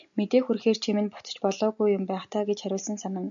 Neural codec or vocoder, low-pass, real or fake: none; 7.2 kHz; real